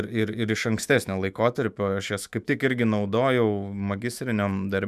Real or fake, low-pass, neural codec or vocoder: fake; 14.4 kHz; autoencoder, 48 kHz, 128 numbers a frame, DAC-VAE, trained on Japanese speech